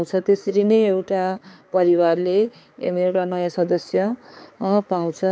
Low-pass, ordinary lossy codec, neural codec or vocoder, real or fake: none; none; codec, 16 kHz, 4 kbps, X-Codec, HuBERT features, trained on balanced general audio; fake